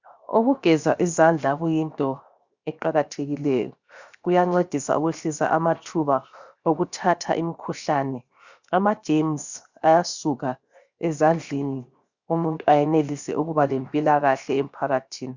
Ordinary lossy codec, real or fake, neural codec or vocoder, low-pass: Opus, 64 kbps; fake; codec, 16 kHz, 0.7 kbps, FocalCodec; 7.2 kHz